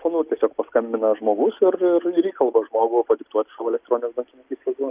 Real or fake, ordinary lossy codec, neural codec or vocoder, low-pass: real; Opus, 24 kbps; none; 3.6 kHz